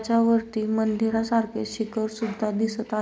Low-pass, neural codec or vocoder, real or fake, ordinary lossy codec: none; none; real; none